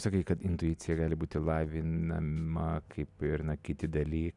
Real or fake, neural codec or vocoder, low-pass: real; none; 10.8 kHz